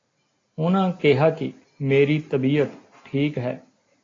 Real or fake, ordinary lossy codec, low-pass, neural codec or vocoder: real; AAC, 32 kbps; 7.2 kHz; none